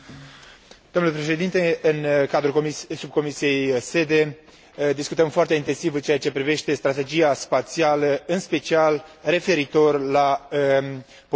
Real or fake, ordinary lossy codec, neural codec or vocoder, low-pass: real; none; none; none